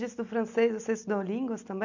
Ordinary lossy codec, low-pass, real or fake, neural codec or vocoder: none; 7.2 kHz; real; none